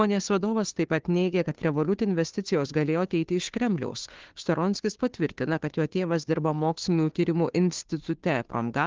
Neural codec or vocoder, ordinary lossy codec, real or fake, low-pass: codec, 16 kHz, 2 kbps, FunCodec, trained on Chinese and English, 25 frames a second; Opus, 16 kbps; fake; 7.2 kHz